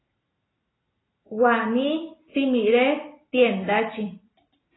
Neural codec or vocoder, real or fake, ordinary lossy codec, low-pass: none; real; AAC, 16 kbps; 7.2 kHz